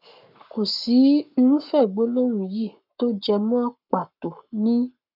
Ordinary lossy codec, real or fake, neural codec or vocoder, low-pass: none; fake; codec, 44.1 kHz, 7.8 kbps, Pupu-Codec; 5.4 kHz